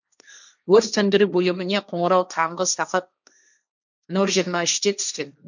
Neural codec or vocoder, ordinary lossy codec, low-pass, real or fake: codec, 16 kHz, 1.1 kbps, Voila-Tokenizer; none; 7.2 kHz; fake